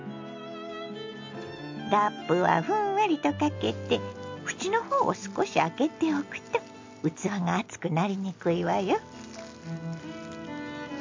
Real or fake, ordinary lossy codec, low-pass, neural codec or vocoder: real; MP3, 48 kbps; 7.2 kHz; none